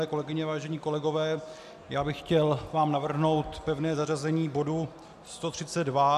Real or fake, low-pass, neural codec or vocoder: real; 14.4 kHz; none